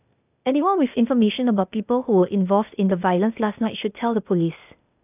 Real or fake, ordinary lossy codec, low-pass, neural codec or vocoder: fake; none; 3.6 kHz; codec, 16 kHz, 0.8 kbps, ZipCodec